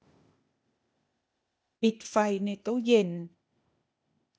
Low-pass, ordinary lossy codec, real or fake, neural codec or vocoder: none; none; fake; codec, 16 kHz, 0.8 kbps, ZipCodec